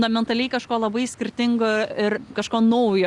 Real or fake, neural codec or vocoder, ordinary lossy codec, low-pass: real; none; Opus, 64 kbps; 10.8 kHz